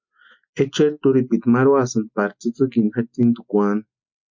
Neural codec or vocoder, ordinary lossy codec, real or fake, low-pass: none; MP3, 48 kbps; real; 7.2 kHz